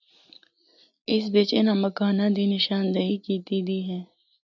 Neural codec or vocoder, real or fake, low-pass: none; real; 7.2 kHz